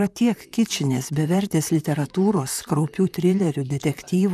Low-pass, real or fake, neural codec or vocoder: 14.4 kHz; fake; vocoder, 44.1 kHz, 128 mel bands, Pupu-Vocoder